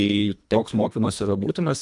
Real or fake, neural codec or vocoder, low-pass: fake; codec, 24 kHz, 1.5 kbps, HILCodec; 10.8 kHz